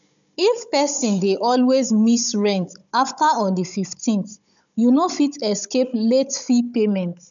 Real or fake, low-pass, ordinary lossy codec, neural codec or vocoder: fake; 7.2 kHz; none; codec, 16 kHz, 16 kbps, FunCodec, trained on Chinese and English, 50 frames a second